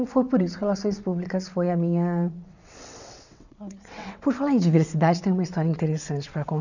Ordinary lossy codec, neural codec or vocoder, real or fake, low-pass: none; vocoder, 22.05 kHz, 80 mel bands, WaveNeXt; fake; 7.2 kHz